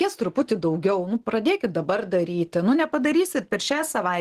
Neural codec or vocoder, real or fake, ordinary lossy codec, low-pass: none; real; Opus, 16 kbps; 14.4 kHz